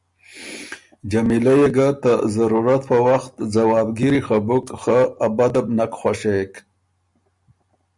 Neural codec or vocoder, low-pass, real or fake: none; 10.8 kHz; real